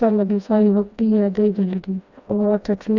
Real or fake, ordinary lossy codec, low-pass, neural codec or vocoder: fake; none; 7.2 kHz; codec, 16 kHz, 1 kbps, FreqCodec, smaller model